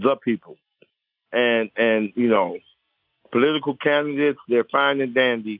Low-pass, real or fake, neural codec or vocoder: 5.4 kHz; real; none